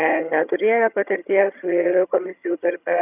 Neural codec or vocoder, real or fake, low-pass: vocoder, 22.05 kHz, 80 mel bands, HiFi-GAN; fake; 3.6 kHz